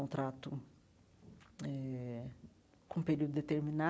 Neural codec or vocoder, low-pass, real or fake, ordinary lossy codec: none; none; real; none